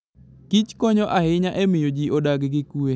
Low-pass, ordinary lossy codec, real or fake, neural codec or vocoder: none; none; real; none